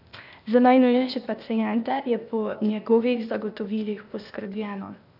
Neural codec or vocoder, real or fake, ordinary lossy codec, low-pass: codec, 16 kHz, 0.8 kbps, ZipCodec; fake; none; 5.4 kHz